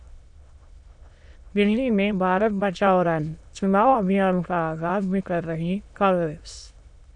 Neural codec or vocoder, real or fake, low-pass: autoencoder, 22.05 kHz, a latent of 192 numbers a frame, VITS, trained on many speakers; fake; 9.9 kHz